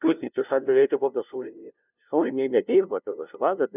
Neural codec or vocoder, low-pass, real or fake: codec, 16 kHz, 0.5 kbps, FunCodec, trained on LibriTTS, 25 frames a second; 3.6 kHz; fake